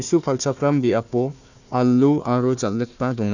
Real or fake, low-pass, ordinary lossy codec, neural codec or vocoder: fake; 7.2 kHz; none; codec, 16 kHz, 1 kbps, FunCodec, trained on Chinese and English, 50 frames a second